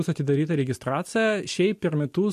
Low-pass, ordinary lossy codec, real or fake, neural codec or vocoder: 14.4 kHz; MP3, 64 kbps; real; none